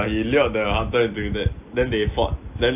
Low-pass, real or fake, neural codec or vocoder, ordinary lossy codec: 3.6 kHz; real; none; AAC, 32 kbps